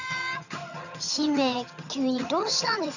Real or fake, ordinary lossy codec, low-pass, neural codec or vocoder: fake; none; 7.2 kHz; vocoder, 22.05 kHz, 80 mel bands, HiFi-GAN